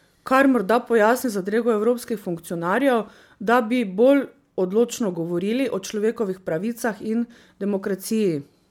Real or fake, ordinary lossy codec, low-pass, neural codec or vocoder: real; MP3, 96 kbps; 19.8 kHz; none